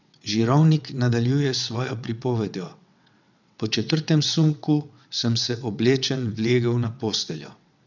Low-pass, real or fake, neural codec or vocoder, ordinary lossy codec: 7.2 kHz; fake; vocoder, 22.05 kHz, 80 mel bands, WaveNeXt; none